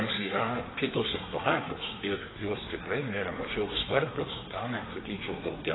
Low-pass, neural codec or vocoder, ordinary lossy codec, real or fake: 7.2 kHz; codec, 24 kHz, 1 kbps, SNAC; AAC, 16 kbps; fake